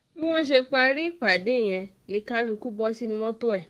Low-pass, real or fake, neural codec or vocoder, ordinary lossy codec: 14.4 kHz; fake; codec, 32 kHz, 1.9 kbps, SNAC; Opus, 24 kbps